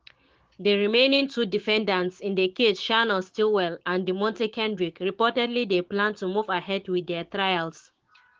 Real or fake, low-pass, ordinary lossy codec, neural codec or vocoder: fake; 7.2 kHz; Opus, 16 kbps; codec, 16 kHz, 6 kbps, DAC